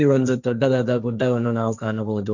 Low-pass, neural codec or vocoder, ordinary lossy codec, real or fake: 7.2 kHz; codec, 16 kHz, 1.1 kbps, Voila-Tokenizer; none; fake